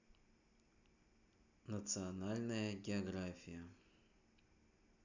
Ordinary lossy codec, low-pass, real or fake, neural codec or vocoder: none; 7.2 kHz; real; none